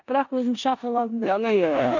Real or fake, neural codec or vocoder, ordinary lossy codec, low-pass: fake; codec, 16 kHz in and 24 kHz out, 0.9 kbps, LongCat-Audio-Codec, four codebook decoder; none; 7.2 kHz